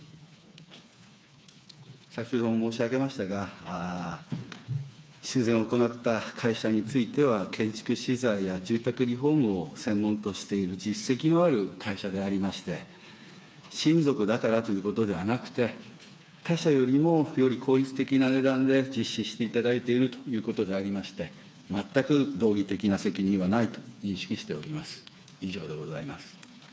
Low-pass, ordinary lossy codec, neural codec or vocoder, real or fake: none; none; codec, 16 kHz, 4 kbps, FreqCodec, smaller model; fake